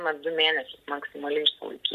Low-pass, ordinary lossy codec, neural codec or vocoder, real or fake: 14.4 kHz; MP3, 64 kbps; none; real